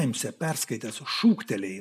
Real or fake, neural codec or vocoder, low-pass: real; none; 14.4 kHz